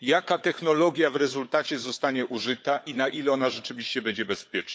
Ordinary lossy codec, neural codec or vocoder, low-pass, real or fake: none; codec, 16 kHz, 4 kbps, FunCodec, trained on Chinese and English, 50 frames a second; none; fake